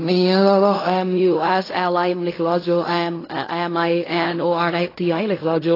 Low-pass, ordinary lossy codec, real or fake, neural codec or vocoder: 5.4 kHz; AAC, 24 kbps; fake; codec, 16 kHz in and 24 kHz out, 0.4 kbps, LongCat-Audio-Codec, fine tuned four codebook decoder